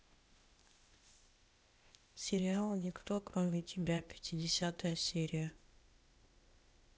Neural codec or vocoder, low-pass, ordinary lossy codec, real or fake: codec, 16 kHz, 0.8 kbps, ZipCodec; none; none; fake